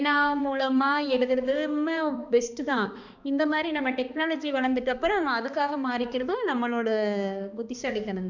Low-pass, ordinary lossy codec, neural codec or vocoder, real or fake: 7.2 kHz; none; codec, 16 kHz, 2 kbps, X-Codec, HuBERT features, trained on balanced general audio; fake